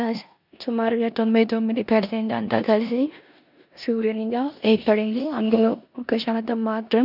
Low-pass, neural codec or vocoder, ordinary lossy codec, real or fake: 5.4 kHz; codec, 16 kHz in and 24 kHz out, 0.9 kbps, LongCat-Audio-Codec, four codebook decoder; none; fake